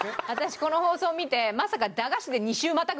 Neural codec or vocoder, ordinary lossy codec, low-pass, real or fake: none; none; none; real